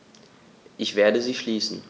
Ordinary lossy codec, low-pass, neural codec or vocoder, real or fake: none; none; none; real